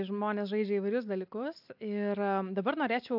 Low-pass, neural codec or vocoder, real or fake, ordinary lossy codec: 5.4 kHz; none; real; AAC, 48 kbps